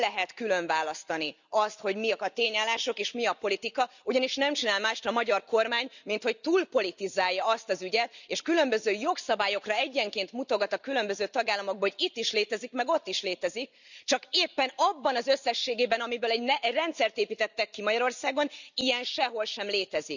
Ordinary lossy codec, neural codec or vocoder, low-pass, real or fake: none; none; 7.2 kHz; real